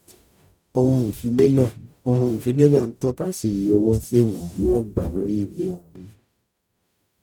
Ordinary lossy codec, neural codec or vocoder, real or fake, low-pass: none; codec, 44.1 kHz, 0.9 kbps, DAC; fake; 19.8 kHz